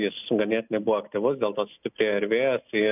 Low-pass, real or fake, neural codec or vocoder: 3.6 kHz; real; none